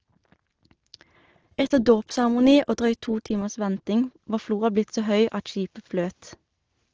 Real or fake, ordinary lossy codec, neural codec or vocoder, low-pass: real; Opus, 16 kbps; none; 7.2 kHz